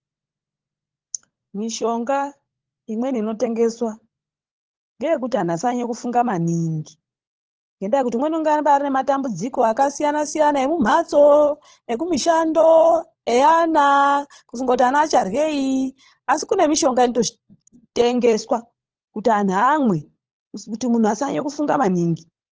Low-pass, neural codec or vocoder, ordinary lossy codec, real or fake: 7.2 kHz; codec, 16 kHz, 16 kbps, FunCodec, trained on LibriTTS, 50 frames a second; Opus, 16 kbps; fake